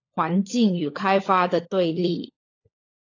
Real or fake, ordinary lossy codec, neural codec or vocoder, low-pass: fake; AAC, 32 kbps; codec, 16 kHz, 16 kbps, FunCodec, trained on LibriTTS, 50 frames a second; 7.2 kHz